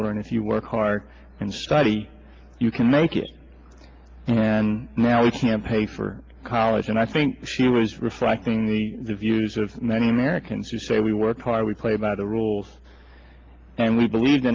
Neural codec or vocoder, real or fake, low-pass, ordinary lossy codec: none; real; 7.2 kHz; Opus, 32 kbps